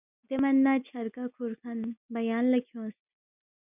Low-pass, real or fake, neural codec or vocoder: 3.6 kHz; real; none